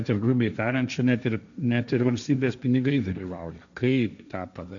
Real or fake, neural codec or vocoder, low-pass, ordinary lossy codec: fake; codec, 16 kHz, 1.1 kbps, Voila-Tokenizer; 7.2 kHz; MP3, 96 kbps